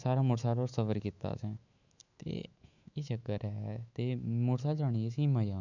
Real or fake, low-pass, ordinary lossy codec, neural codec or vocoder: fake; 7.2 kHz; none; autoencoder, 48 kHz, 128 numbers a frame, DAC-VAE, trained on Japanese speech